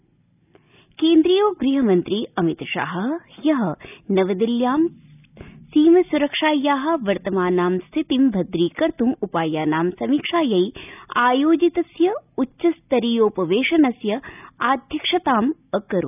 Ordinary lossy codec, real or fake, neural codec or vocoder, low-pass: none; real; none; 3.6 kHz